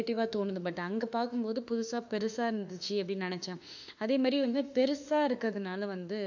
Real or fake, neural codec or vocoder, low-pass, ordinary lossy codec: fake; autoencoder, 48 kHz, 32 numbers a frame, DAC-VAE, trained on Japanese speech; 7.2 kHz; none